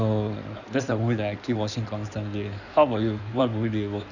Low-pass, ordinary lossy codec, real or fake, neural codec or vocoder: 7.2 kHz; none; fake; codec, 16 kHz in and 24 kHz out, 2.2 kbps, FireRedTTS-2 codec